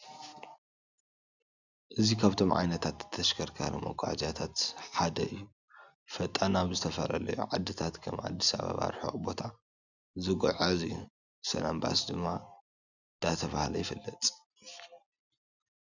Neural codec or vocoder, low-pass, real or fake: none; 7.2 kHz; real